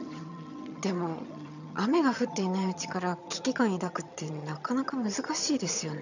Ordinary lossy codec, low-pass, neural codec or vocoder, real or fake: none; 7.2 kHz; vocoder, 22.05 kHz, 80 mel bands, HiFi-GAN; fake